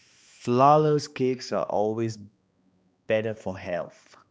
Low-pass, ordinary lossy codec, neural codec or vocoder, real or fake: none; none; codec, 16 kHz, 2 kbps, X-Codec, HuBERT features, trained on balanced general audio; fake